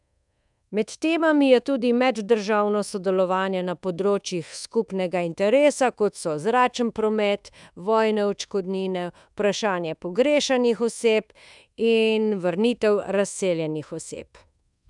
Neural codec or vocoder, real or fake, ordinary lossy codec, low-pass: codec, 24 kHz, 1.2 kbps, DualCodec; fake; none; 10.8 kHz